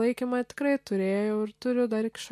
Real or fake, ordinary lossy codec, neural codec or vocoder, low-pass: real; MP3, 64 kbps; none; 14.4 kHz